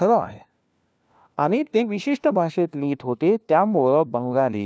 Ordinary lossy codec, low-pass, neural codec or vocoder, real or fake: none; none; codec, 16 kHz, 1 kbps, FunCodec, trained on LibriTTS, 50 frames a second; fake